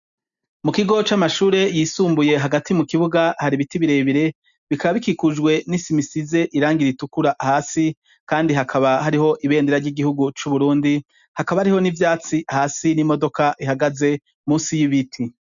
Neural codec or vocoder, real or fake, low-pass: none; real; 7.2 kHz